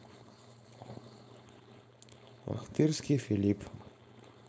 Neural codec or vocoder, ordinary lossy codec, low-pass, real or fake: codec, 16 kHz, 4.8 kbps, FACodec; none; none; fake